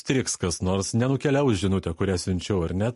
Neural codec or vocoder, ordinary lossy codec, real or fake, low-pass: none; MP3, 48 kbps; real; 14.4 kHz